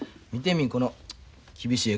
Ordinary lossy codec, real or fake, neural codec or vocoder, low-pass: none; real; none; none